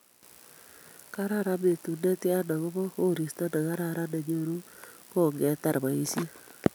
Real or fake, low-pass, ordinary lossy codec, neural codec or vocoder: real; none; none; none